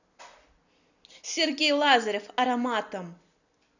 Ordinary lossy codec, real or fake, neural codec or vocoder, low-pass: none; real; none; 7.2 kHz